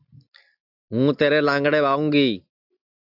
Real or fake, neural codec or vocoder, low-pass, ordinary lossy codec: real; none; 5.4 kHz; AAC, 48 kbps